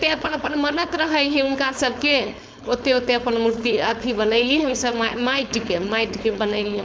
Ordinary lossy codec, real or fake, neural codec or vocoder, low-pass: none; fake; codec, 16 kHz, 4.8 kbps, FACodec; none